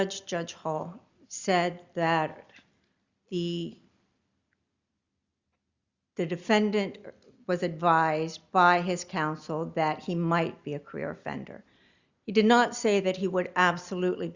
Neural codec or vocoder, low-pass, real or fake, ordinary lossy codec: none; 7.2 kHz; real; Opus, 64 kbps